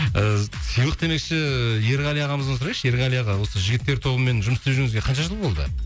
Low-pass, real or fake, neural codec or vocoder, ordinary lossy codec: none; real; none; none